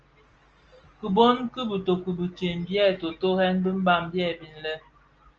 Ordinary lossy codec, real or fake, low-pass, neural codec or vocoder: Opus, 24 kbps; real; 7.2 kHz; none